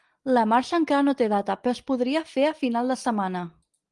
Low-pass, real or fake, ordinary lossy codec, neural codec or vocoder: 10.8 kHz; real; Opus, 24 kbps; none